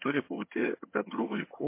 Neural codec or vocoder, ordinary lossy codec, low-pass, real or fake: vocoder, 22.05 kHz, 80 mel bands, HiFi-GAN; MP3, 24 kbps; 3.6 kHz; fake